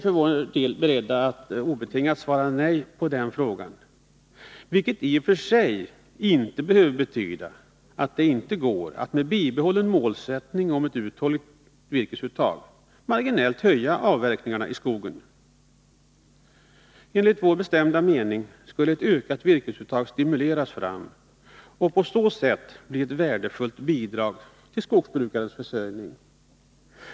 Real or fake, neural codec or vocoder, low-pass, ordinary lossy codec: real; none; none; none